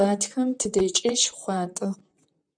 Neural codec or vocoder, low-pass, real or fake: vocoder, 44.1 kHz, 128 mel bands, Pupu-Vocoder; 9.9 kHz; fake